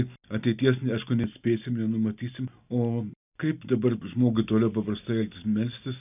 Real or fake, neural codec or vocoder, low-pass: real; none; 3.6 kHz